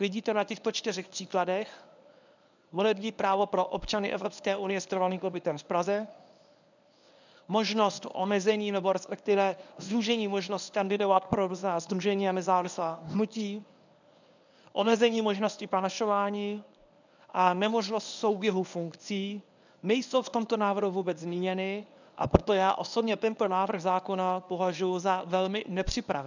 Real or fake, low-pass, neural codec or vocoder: fake; 7.2 kHz; codec, 24 kHz, 0.9 kbps, WavTokenizer, medium speech release version 1